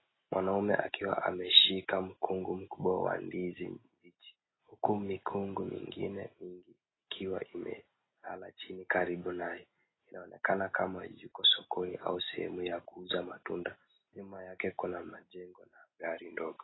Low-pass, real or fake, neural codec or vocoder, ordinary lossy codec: 7.2 kHz; real; none; AAC, 16 kbps